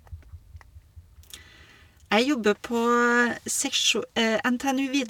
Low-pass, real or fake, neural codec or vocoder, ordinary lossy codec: 19.8 kHz; real; none; none